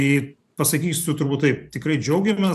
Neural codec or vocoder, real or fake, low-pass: vocoder, 44.1 kHz, 128 mel bands every 512 samples, BigVGAN v2; fake; 14.4 kHz